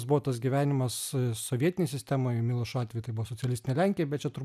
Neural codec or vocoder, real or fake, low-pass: none; real; 14.4 kHz